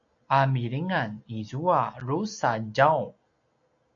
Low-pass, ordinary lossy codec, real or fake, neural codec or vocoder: 7.2 kHz; AAC, 64 kbps; real; none